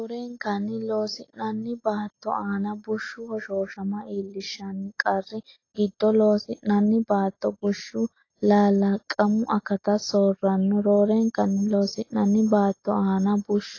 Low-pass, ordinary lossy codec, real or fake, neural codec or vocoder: 7.2 kHz; AAC, 32 kbps; real; none